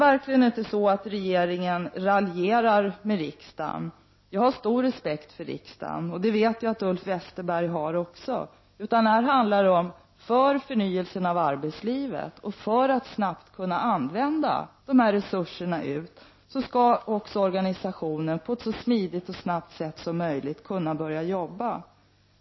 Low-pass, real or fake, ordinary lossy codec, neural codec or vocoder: 7.2 kHz; real; MP3, 24 kbps; none